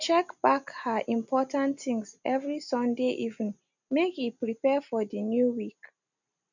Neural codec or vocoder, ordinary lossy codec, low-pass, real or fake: none; none; 7.2 kHz; real